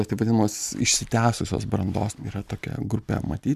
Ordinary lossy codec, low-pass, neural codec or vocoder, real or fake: MP3, 96 kbps; 14.4 kHz; none; real